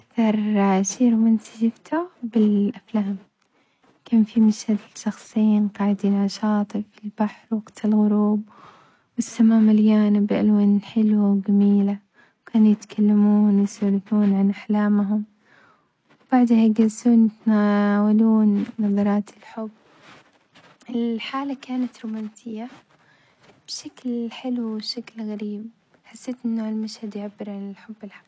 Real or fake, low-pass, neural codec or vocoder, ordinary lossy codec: real; none; none; none